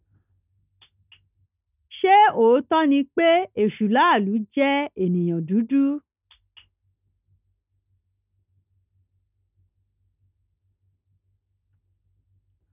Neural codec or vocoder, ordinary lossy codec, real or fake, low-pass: none; none; real; 3.6 kHz